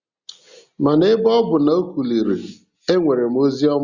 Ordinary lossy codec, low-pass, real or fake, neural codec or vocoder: Opus, 64 kbps; 7.2 kHz; real; none